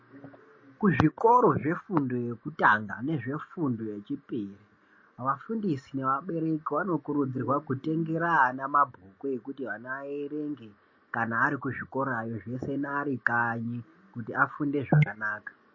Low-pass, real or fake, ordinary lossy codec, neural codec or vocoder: 7.2 kHz; real; MP3, 32 kbps; none